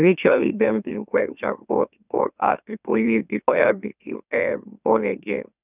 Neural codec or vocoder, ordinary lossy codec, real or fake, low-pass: autoencoder, 44.1 kHz, a latent of 192 numbers a frame, MeloTTS; none; fake; 3.6 kHz